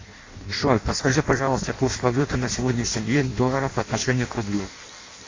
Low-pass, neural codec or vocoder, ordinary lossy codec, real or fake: 7.2 kHz; codec, 16 kHz in and 24 kHz out, 0.6 kbps, FireRedTTS-2 codec; AAC, 32 kbps; fake